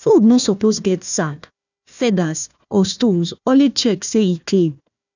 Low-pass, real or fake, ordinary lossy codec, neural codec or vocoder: 7.2 kHz; fake; none; codec, 16 kHz, 1 kbps, FunCodec, trained on Chinese and English, 50 frames a second